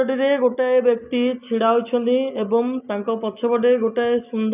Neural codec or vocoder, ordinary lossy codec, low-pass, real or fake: none; none; 3.6 kHz; real